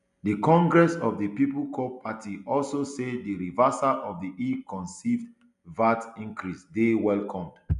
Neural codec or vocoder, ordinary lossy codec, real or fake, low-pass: none; none; real; 10.8 kHz